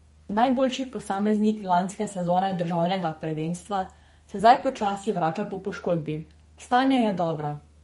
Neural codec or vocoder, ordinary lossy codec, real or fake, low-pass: codec, 32 kHz, 1.9 kbps, SNAC; MP3, 48 kbps; fake; 14.4 kHz